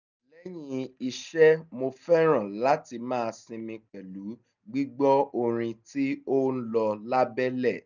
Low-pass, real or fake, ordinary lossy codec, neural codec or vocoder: 7.2 kHz; real; none; none